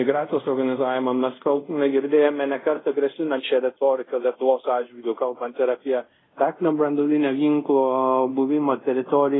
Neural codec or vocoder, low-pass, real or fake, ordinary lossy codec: codec, 24 kHz, 0.5 kbps, DualCodec; 7.2 kHz; fake; AAC, 16 kbps